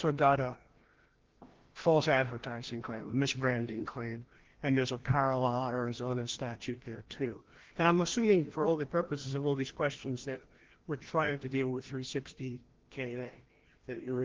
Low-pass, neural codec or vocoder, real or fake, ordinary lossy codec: 7.2 kHz; codec, 16 kHz, 1 kbps, FreqCodec, larger model; fake; Opus, 16 kbps